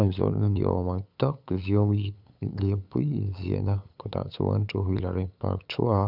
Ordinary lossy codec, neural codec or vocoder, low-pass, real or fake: none; codec, 16 kHz, 8 kbps, FunCodec, trained on LibriTTS, 25 frames a second; 5.4 kHz; fake